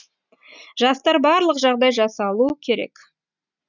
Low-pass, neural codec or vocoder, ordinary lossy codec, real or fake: 7.2 kHz; none; none; real